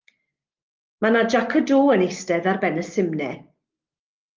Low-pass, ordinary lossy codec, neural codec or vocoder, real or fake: 7.2 kHz; Opus, 24 kbps; none; real